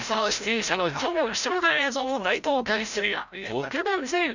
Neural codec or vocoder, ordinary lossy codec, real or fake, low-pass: codec, 16 kHz, 0.5 kbps, FreqCodec, larger model; none; fake; 7.2 kHz